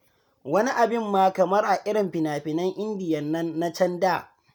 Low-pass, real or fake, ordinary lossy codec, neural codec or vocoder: none; real; none; none